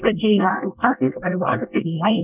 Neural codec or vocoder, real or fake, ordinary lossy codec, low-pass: codec, 24 kHz, 1 kbps, SNAC; fake; none; 3.6 kHz